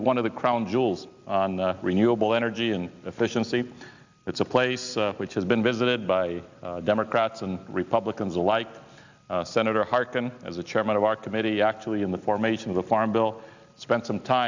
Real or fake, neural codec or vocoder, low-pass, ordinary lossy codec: real; none; 7.2 kHz; Opus, 64 kbps